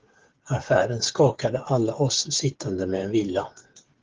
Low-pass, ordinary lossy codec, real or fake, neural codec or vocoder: 7.2 kHz; Opus, 16 kbps; fake; codec, 16 kHz, 8 kbps, FreqCodec, smaller model